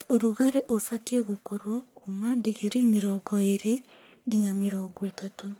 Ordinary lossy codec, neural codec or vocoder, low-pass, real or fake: none; codec, 44.1 kHz, 1.7 kbps, Pupu-Codec; none; fake